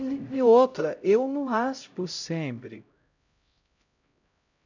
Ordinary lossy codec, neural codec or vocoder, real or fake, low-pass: none; codec, 16 kHz, 0.5 kbps, X-Codec, HuBERT features, trained on LibriSpeech; fake; 7.2 kHz